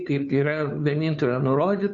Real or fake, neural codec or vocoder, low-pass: fake; codec, 16 kHz, 2 kbps, FunCodec, trained on Chinese and English, 25 frames a second; 7.2 kHz